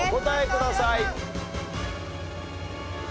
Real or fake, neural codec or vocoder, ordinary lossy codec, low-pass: real; none; none; none